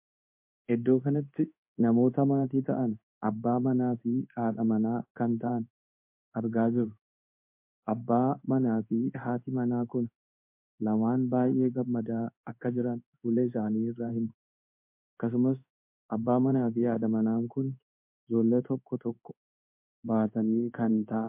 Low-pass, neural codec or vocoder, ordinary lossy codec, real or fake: 3.6 kHz; codec, 16 kHz in and 24 kHz out, 1 kbps, XY-Tokenizer; MP3, 32 kbps; fake